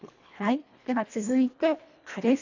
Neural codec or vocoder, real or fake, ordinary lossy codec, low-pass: codec, 24 kHz, 1.5 kbps, HILCodec; fake; AAC, 48 kbps; 7.2 kHz